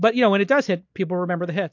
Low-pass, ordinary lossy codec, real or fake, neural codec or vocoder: 7.2 kHz; MP3, 48 kbps; real; none